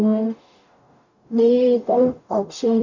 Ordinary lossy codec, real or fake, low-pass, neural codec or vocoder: none; fake; 7.2 kHz; codec, 44.1 kHz, 0.9 kbps, DAC